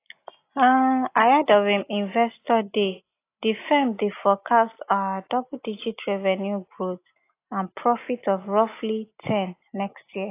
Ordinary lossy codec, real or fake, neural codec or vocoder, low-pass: AAC, 24 kbps; real; none; 3.6 kHz